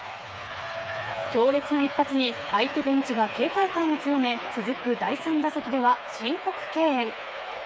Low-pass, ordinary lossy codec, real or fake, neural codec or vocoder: none; none; fake; codec, 16 kHz, 4 kbps, FreqCodec, smaller model